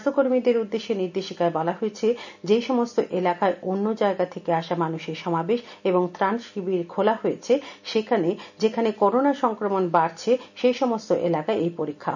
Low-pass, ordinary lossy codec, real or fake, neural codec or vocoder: 7.2 kHz; none; real; none